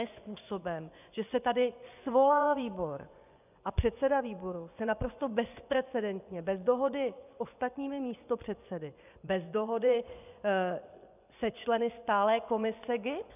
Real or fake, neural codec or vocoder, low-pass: fake; vocoder, 24 kHz, 100 mel bands, Vocos; 3.6 kHz